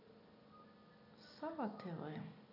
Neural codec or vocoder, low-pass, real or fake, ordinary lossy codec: none; 5.4 kHz; real; none